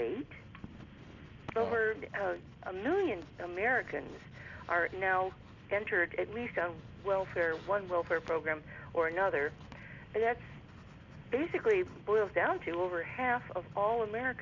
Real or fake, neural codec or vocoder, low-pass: real; none; 7.2 kHz